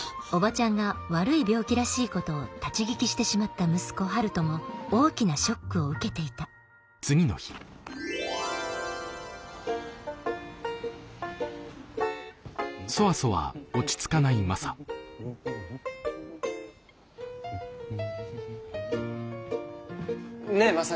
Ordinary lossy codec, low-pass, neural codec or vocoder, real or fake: none; none; none; real